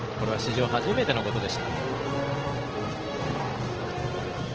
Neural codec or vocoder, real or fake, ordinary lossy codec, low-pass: none; real; Opus, 16 kbps; 7.2 kHz